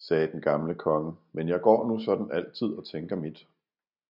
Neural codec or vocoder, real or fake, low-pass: none; real; 5.4 kHz